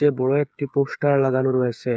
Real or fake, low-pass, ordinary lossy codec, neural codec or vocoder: fake; none; none; codec, 16 kHz, 8 kbps, FreqCodec, smaller model